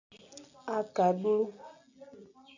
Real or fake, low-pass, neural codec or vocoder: real; 7.2 kHz; none